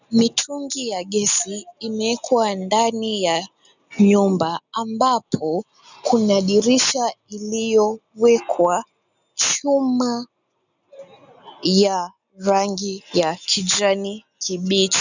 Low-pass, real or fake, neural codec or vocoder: 7.2 kHz; real; none